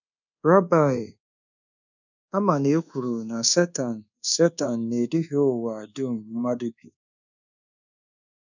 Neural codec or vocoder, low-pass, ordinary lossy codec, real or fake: codec, 24 kHz, 1.2 kbps, DualCodec; 7.2 kHz; none; fake